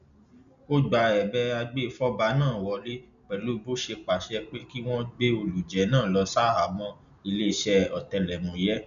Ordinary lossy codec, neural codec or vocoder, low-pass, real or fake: none; none; 7.2 kHz; real